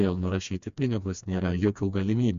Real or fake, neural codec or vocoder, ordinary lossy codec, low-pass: fake; codec, 16 kHz, 2 kbps, FreqCodec, smaller model; MP3, 64 kbps; 7.2 kHz